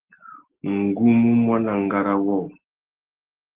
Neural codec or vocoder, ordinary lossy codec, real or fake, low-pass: none; Opus, 16 kbps; real; 3.6 kHz